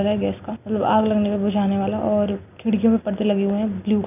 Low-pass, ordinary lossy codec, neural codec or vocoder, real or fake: 3.6 kHz; none; none; real